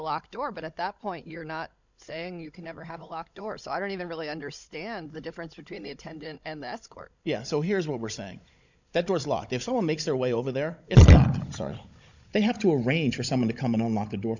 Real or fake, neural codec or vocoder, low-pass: fake; codec, 16 kHz, 16 kbps, FunCodec, trained on Chinese and English, 50 frames a second; 7.2 kHz